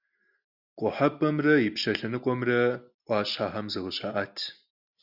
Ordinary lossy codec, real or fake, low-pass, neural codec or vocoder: Opus, 64 kbps; real; 5.4 kHz; none